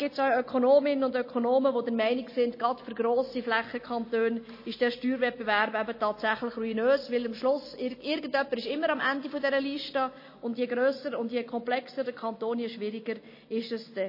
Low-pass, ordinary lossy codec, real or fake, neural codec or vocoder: 5.4 kHz; MP3, 24 kbps; real; none